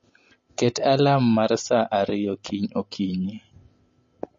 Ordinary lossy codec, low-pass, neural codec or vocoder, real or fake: MP3, 32 kbps; 7.2 kHz; none; real